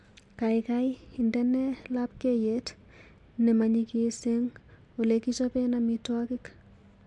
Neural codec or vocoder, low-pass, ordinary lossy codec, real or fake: none; 10.8 kHz; MP3, 64 kbps; real